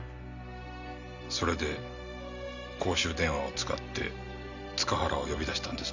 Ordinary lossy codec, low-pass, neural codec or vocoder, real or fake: none; 7.2 kHz; none; real